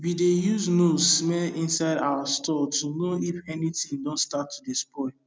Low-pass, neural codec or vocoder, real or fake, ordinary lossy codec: none; none; real; none